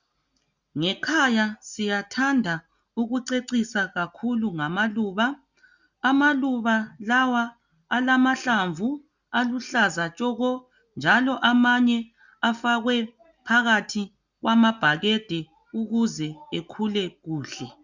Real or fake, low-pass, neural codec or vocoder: real; 7.2 kHz; none